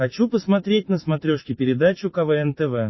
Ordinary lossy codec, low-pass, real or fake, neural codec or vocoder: MP3, 24 kbps; 7.2 kHz; real; none